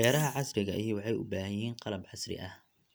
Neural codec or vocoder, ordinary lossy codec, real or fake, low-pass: none; none; real; none